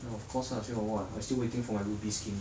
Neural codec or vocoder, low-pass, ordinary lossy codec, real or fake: none; none; none; real